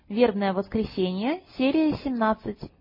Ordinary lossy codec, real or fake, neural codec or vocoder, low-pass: MP3, 24 kbps; real; none; 5.4 kHz